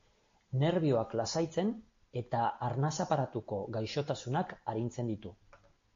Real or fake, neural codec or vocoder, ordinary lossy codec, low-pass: real; none; AAC, 48 kbps; 7.2 kHz